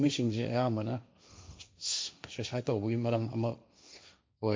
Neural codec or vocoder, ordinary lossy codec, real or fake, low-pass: codec, 16 kHz, 1.1 kbps, Voila-Tokenizer; none; fake; none